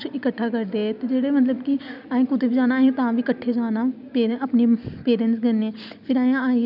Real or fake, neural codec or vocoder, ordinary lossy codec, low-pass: real; none; none; 5.4 kHz